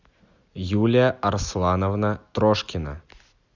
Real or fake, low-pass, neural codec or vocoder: real; 7.2 kHz; none